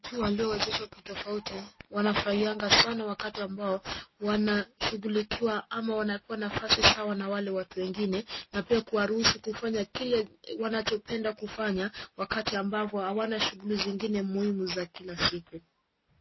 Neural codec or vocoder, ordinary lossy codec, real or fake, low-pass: none; MP3, 24 kbps; real; 7.2 kHz